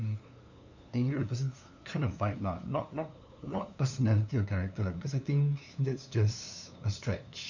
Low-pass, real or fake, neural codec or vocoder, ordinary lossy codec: 7.2 kHz; fake; codec, 16 kHz, 2 kbps, FunCodec, trained on LibriTTS, 25 frames a second; none